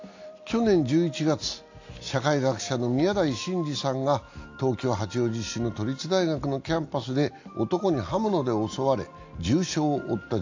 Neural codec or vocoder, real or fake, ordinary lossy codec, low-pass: none; real; AAC, 48 kbps; 7.2 kHz